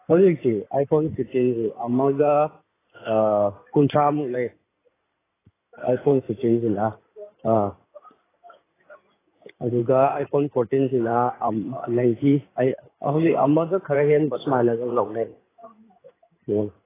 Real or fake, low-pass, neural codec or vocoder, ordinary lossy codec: fake; 3.6 kHz; codec, 16 kHz in and 24 kHz out, 2.2 kbps, FireRedTTS-2 codec; AAC, 16 kbps